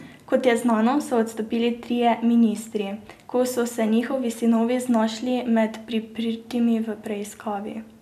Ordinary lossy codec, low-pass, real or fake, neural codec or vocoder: none; 14.4 kHz; real; none